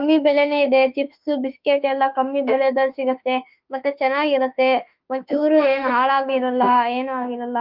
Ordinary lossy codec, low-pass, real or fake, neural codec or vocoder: Opus, 24 kbps; 5.4 kHz; fake; autoencoder, 48 kHz, 32 numbers a frame, DAC-VAE, trained on Japanese speech